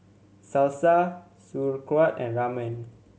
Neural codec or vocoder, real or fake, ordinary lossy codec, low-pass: none; real; none; none